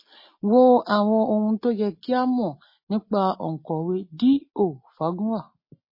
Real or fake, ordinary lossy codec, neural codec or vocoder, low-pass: real; MP3, 24 kbps; none; 5.4 kHz